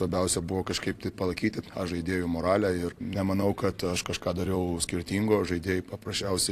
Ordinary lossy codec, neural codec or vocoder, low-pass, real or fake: AAC, 64 kbps; vocoder, 44.1 kHz, 128 mel bands every 256 samples, BigVGAN v2; 14.4 kHz; fake